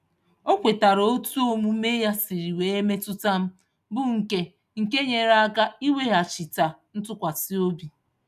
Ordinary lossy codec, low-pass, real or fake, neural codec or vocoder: none; 14.4 kHz; real; none